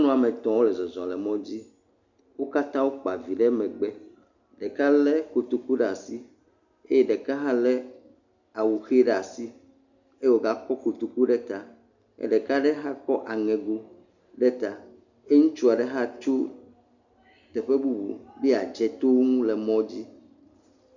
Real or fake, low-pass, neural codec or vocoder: real; 7.2 kHz; none